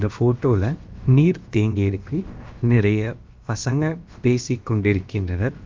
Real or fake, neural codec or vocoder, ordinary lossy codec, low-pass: fake; codec, 16 kHz, about 1 kbps, DyCAST, with the encoder's durations; Opus, 32 kbps; 7.2 kHz